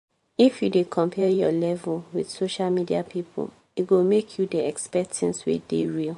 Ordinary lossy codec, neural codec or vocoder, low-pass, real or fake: MP3, 48 kbps; vocoder, 44.1 kHz, 128 mel bands every 512 samples, BigVGAN v2; 14.4 kHz; fake